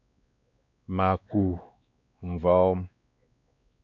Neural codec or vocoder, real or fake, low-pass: codec, 16 kHz, 2 kbps, X-Codec, WavLM features, trained on Multilingual LibriSpeech; fake; 7.2 kHz